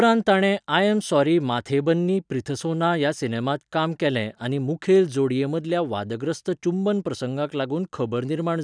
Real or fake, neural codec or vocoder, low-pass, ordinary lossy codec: real; none; 9.9 kHz; none